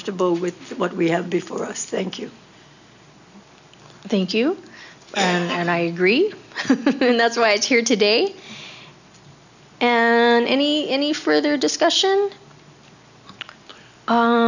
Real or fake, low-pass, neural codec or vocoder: real; 7.2 kHz; none